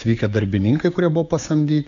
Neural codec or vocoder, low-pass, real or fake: codec, 16 kHz, 6 kbps, DAC; 7.2 kHz; fake